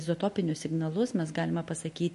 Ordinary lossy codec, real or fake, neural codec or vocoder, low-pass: MP3, 48 kbps; real; none; 14.4 kHz